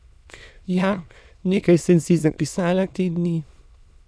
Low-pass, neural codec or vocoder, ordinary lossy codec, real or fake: none; autoencoder, 22.05 kHz, a latent of 192 numbers a frame, VITS, trained on many speakers; none; fake